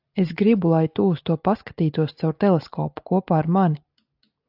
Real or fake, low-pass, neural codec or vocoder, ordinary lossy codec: real; 5.4 kHz; none; AAC, 48 kbps